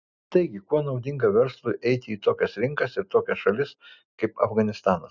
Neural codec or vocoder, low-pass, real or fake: none; 7.2 kHz; real